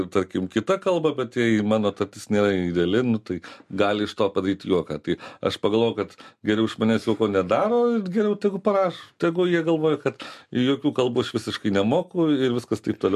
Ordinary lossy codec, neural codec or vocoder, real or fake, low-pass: MP3, 64 kbps; none; real; 14.4 kHz